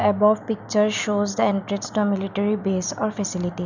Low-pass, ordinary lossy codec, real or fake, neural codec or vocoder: 7.2 kHz; none; real; none